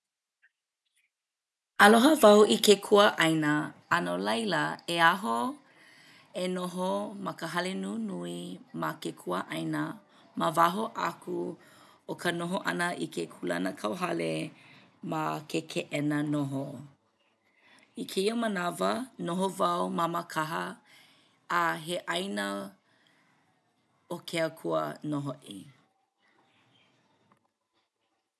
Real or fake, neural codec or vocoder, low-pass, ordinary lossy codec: real; none; none; none